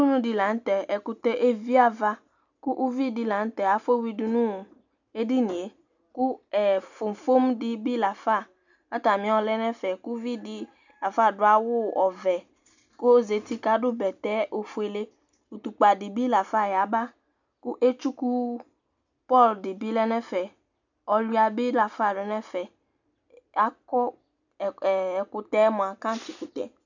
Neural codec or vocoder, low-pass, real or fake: none; 7.2 kHz; real